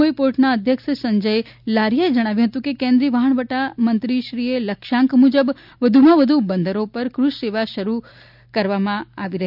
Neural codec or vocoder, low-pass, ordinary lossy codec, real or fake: none; 5.4 kHz; none; real